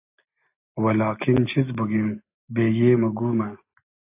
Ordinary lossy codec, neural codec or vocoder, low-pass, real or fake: AAC, 24 kbps; none; 3.6 kHz; real